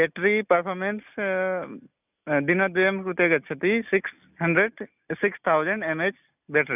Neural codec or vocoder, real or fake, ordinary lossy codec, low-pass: none; real; none; 3.6 kHz